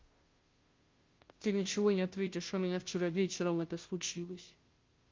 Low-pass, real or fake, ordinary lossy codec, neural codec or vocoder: 7.2 kHz; fake; Opus, 32 kbps; codec, 16 kHz, 0.5 kbps, FunCodec, trained on Chinese and English, 25 frames a second